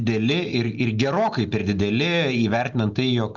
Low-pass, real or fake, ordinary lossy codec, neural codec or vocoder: 7.2 kHz; real; Opus, 64 kbps; none